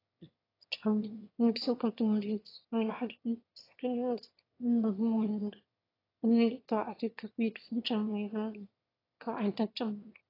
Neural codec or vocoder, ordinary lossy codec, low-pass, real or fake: autoencoder, 22.05 kHz, a latent of 192 numbers a frame, VITS, trained on one speaker; AAC, 24 kbps; 5.4 kHz; fake